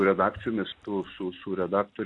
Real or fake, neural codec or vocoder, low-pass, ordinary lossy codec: real; none; 10.8 kHz; AAC, 48 kbps